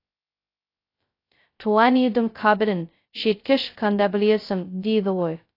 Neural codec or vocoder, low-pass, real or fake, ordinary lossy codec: codec, 16 kHz, 0.2 kbps, FocalCodec; 5.4 kHz; fake; AAC, 32 kbps